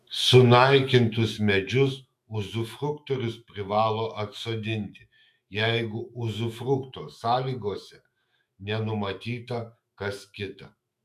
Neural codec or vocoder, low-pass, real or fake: autoencoder, 48 kHz, 128 numbers a frame, DAC-VAE, trained on Japanese speech; 14.4 kHz; fake